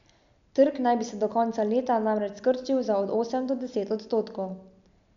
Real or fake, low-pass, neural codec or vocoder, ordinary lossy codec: real; 7.2 kHz; none; MP3, 64 kbps